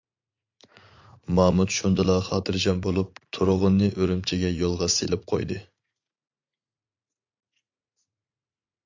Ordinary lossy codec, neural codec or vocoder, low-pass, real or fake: MP3, 64 kbps; vocoder, 44.1 kHz, 80 mel bands, Vocos; 7.2 kHz; fake